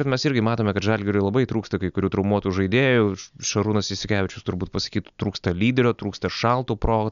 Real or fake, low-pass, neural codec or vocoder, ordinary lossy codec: real; 7.2 kHz; none; MP3, 96 kbps